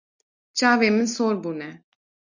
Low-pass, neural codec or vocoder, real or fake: 7.2 kHz; none; real